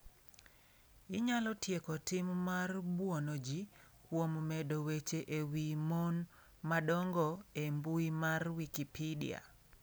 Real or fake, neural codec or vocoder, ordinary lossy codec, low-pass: real; none; none; none